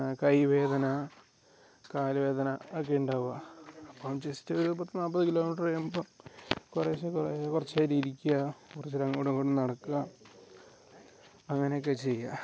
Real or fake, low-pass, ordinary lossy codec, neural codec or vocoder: real; none; none; none